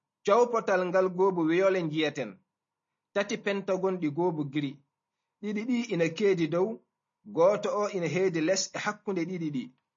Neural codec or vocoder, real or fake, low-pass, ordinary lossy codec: none; real; 7.2 kHz; MP3, 32 kbps